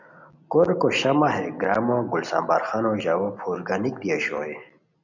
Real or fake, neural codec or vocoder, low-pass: real; none; 7.2 kHz